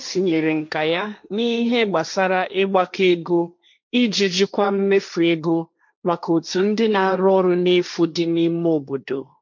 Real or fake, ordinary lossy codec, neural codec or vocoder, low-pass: fake; MP3, 64 kbps; codec, 16 kHz, 1.1 kbps, Voila-Tokenizer; 7.2 kHz